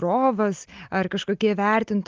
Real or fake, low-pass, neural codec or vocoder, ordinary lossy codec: real; 7.2 kHz; none; Opus, 24 kbps